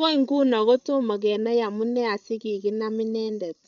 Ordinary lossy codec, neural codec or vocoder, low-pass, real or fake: none; codec, 16 kHz, 8 kbps, FreqCodec, larger model; 7.2 kHz; fake